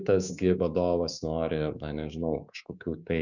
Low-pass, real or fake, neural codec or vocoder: 7.2 kHz; fake; codec, 24 kHz, 3.1 kbps, DualCodec